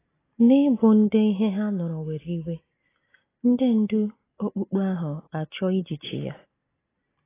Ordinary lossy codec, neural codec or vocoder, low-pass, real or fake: AAC, 16 kbps; none; 3.6 kHz; real